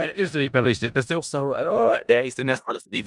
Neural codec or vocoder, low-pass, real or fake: codec, 16 kHz in and 24 kHz out, 0.4 kbps, LongCat-Audio-Codec, four codebook decoder; 10.8 kHz; fake